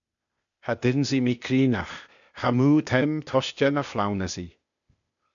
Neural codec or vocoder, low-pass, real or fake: codec, 16 kHz, 0.8 kbps, ZipCodec; 7.2 kHz; fake